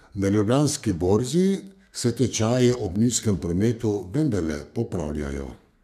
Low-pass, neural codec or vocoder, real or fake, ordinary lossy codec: 14.4 kHz; codec, 32 kHz, 1.9 kbps, SNAC; fake; none